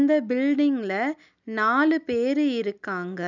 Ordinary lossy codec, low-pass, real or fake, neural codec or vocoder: none; 7.2 kHz; real; none